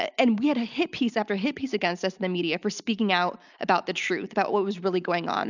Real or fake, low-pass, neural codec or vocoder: real; 7.2 kHz; none